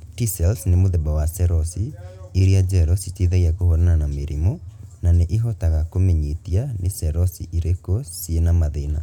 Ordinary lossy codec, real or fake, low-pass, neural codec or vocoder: none; real; 19.8 kHz; none